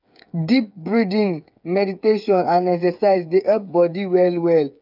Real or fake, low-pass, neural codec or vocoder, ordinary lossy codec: fake; 5.4 kHz; codec, 16 kHz, 8 kbps, FreqCodec, smaller model; none